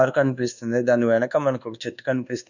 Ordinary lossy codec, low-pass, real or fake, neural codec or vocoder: none; 7.2 kHz; fake; codec, 24 kHz, 1.2 kbps, DualCodec